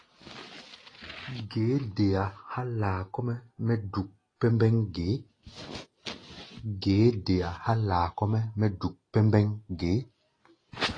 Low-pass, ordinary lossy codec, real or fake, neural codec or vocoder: 9.9 kHz; AAC, 32 kbps; real; none